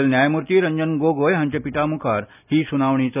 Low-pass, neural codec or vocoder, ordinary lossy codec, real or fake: 3.6 kHz; none; none; real